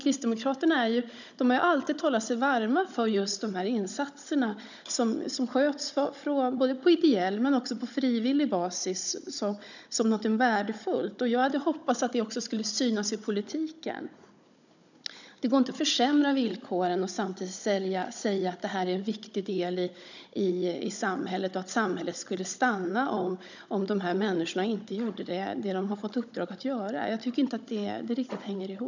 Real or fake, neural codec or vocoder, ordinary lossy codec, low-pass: fake; codec, 16 kHz, 16 kbps, FunCodec, trained on Chinese and English, 50 frames a second; none; 7.2 kHz